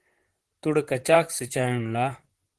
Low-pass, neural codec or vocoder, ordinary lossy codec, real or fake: 10.8 kHz; vocoder, 44.1 kHz, 128 mel bands, Pupu-Vocoder; Opus, 16 kbps; fake